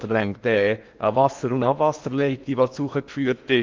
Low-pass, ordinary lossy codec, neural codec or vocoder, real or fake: 7.2 kHz; Opus, 24 kbps; codec, 16 kHz in and 24 kHz out, 0.8 kbps, FocalCodec, streaming, 65536 codes; fake